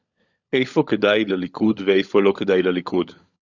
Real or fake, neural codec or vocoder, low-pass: fake; codec, 16 kHz, 16 kbps, FunCodec, trained on LibriTTS, 50 frames a second; 7.2 kHz